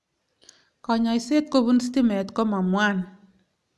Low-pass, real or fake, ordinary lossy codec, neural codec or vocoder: none; real; none; none